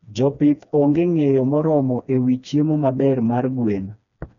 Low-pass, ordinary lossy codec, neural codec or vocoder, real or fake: 7.2 kHz; none; codec, 16 kHz, 2 kbps, FreqCodec, smaller model; fake